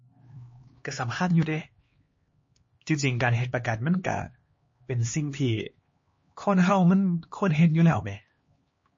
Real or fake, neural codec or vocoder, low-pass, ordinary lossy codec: fake; codec, 16 kHz, 2 kbps, X-Codec, HuBERT features, trained on LibriSpeech; 7.2 kHz; MP3, 32 kbps